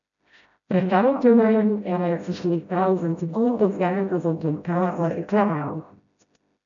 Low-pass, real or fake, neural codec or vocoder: 7.2 kHz; fake; codec, 16 kHz, 0.5 kbps, FreqCodec, smaller model